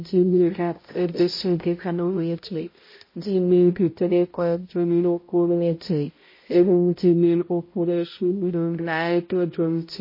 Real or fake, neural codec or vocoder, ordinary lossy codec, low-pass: fake; codec, 16 kHz, 0.5 kbps, X-Codec, HuBERT features, trained on balanced general audio; MP3, 24 kbps; 5.4 kHz